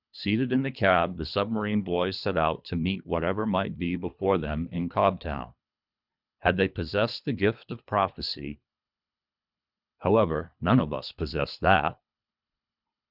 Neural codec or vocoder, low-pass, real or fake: codec, 24 kHz, 3 kbps, HILCodec; 5.4 kHz; fake